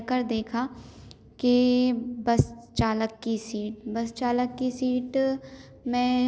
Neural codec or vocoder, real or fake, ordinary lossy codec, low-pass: none; real; none; none